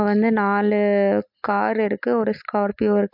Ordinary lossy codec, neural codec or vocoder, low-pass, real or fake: MP3, 48 kbps; none; 5.4 kHz; real